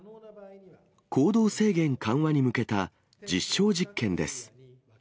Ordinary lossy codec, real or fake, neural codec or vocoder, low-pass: none; real; none; none